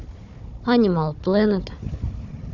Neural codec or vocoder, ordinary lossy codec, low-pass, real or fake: codec, 16 kHz, 4 kbps, FunCodec, trained on Chinese and English, 50 frames a second; none; 7.2 kHz; fake